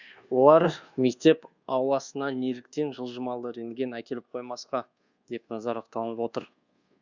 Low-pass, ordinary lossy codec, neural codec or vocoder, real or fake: 7.2 kHz; Opus, 64 kbps; codec, 24 kHz, 1.2 kbps, DualCodec; fake